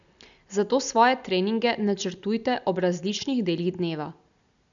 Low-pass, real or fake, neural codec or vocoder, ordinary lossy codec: 7.2 kHz; real; none; none